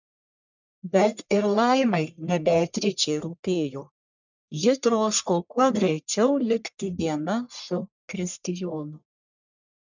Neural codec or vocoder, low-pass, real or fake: codec, 44.1 kHz, 1.7 kbps, Pupu-Codec; 7.2 kHz; fake